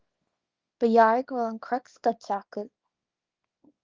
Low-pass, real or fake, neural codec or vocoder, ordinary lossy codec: 7.2 kHz; fake; codec, 24 kHz, 0.9 kbps, WavTokenizer, small release; Opus, 24 kbps